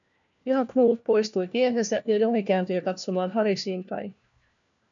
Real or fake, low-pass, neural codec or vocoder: fake; 7.2 kHz; codec, 16 kHz, 1 kbps, FunCodec, trained on LibriTTS, 50 frames a second